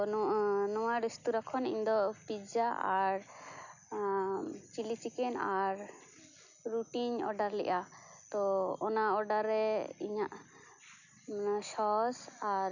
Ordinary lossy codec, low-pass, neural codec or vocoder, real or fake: none; 7.2 kHz; none; real